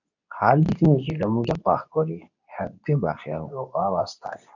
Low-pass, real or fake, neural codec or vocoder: 7.2 kHz; fake; codec, 24 kHz, 0.9 kbps, WavTokenizer, medium speech release version 2